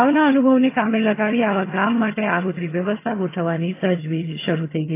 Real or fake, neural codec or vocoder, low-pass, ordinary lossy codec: fake; vocoder, 22.05 kHz, 80 mel bands, HiFi-GAN; 3.6 kHz; AAC, 24 kbps